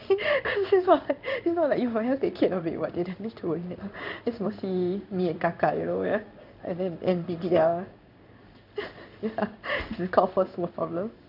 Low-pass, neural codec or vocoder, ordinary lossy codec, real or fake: 5.4 kHz; codec, 16 kHz in and 24 kHz out, 1 kbps, XY-Tokenizer; none; fake